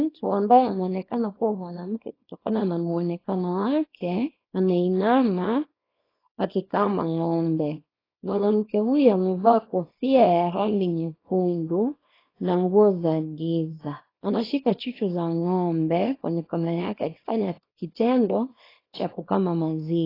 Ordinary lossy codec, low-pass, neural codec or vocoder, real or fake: AAC, 24 kbps; 5.4 kHz; codec, 24 kHz, 0.9 kbps, WavTokenizer, small release; fake